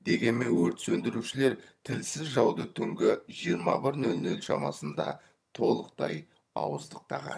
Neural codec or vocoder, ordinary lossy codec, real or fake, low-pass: vocoder, 22.05 kHz, 80 mel bands, HiFi-GAN; none; fake; none